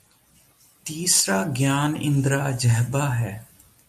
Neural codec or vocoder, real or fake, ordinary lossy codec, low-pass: none; real; MP3, 96 kbps; 14.4 kHz